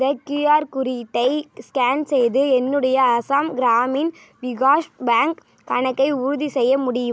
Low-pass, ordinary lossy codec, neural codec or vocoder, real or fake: none; none; none; real